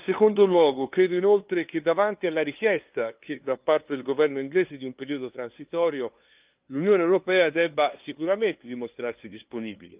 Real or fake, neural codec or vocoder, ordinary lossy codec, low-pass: fake; codec, 16 kHz, 2 kbps, FunCodec, trained on LibriTTS, 25 frames a second; Opus, 32 kbps; 3.6 kHz